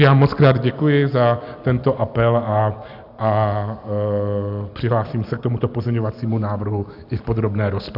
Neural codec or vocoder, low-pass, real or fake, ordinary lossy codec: none; 5.4 kHz; real; AAC, 48 kbps